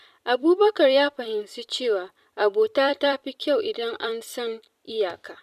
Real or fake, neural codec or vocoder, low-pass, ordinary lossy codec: fake; vocoder, 44.1 kHz, 128 mel bands every 256 samples, BigVGAN v2; 14.4 kHz; none